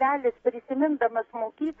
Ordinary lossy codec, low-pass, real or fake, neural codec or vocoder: AAC, 24 kbps; 19.8 kHz; fake; codec, 44.1 kHz, 7.8 kbps, DAC